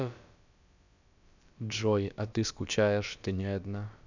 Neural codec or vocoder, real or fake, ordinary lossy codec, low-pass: codec, 16 kHz, about 1 kbps, DyCAST, with the encoder's durations; fake; none; 7.2 kHz